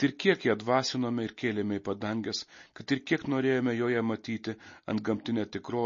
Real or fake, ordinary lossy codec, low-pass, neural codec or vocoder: real; MP3, 32 kbps; 7.2 kHz; none